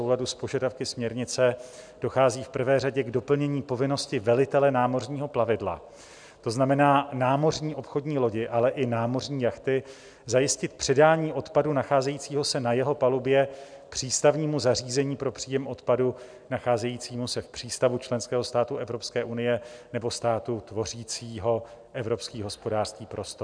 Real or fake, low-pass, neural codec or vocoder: real; 9.9 kHz; none